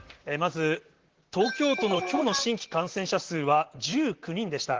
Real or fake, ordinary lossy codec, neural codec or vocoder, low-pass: fake; Opus, 16 kbps; vocoder, 44.1 kHz, 128 mel bands, Pupu-Vocoder; 7.2 kHz